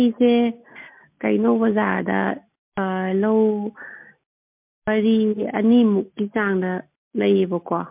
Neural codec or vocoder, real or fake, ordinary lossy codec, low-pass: none; real; MP3, 32 kbps; 3.6 kHz